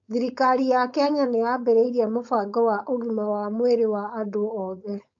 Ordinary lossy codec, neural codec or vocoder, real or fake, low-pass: MP3, 48 kbps; codec, 16 kHz, 4.8 kbps, FACodec; fake; 7.2 kHz